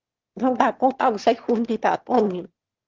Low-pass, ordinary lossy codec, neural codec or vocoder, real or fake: 7.2 kHz; Opus, 16 kbps; autoencoder, 22.05 kHz, a latent of 192 numbers a frame, VITS, trained on one speaker; fake